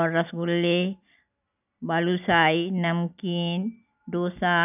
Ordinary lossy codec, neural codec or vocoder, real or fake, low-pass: none; none; real; 3.6 kHz